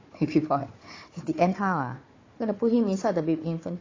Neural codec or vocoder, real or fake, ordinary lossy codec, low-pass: codec, 16 kHz, 4 kbps, FunCodec, trained on Chinese and English, 50 frames a second; fake; AAC, 32 kbps; 7.2 kHz